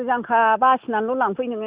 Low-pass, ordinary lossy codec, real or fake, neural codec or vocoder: 3.6 kHz; Opus, 64 kbps; real; none